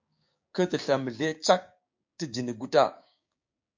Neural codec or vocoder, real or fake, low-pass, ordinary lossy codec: codec, 16 kHz, 6 kbps, DAC; fake; 7.2 kHz; MP3, 48 kbps